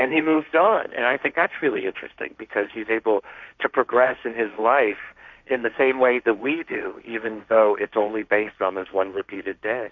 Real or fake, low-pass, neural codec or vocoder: fake; 7.2 kHz; codec, 16 kHz, 1.1 kbps, Voila-Tokenizer